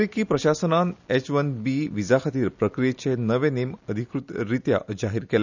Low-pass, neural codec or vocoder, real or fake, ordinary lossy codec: 7.2 kHz; none; real; none